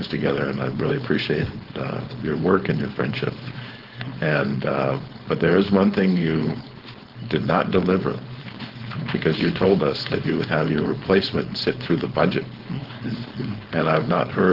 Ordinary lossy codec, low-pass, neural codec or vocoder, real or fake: Opus, 16 kbps; 5.4 kHz; codec, 16 kHz, 4.8 kbps, FACodec; fake